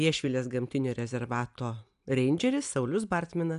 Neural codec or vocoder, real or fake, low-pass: none; real; 10.8 kHz